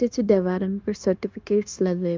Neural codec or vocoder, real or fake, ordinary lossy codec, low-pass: codec, 16 kHz, 0.9 kbps, LongCat-Audio-Codec; fake; Opus, 24 kbps; 7.2 kHz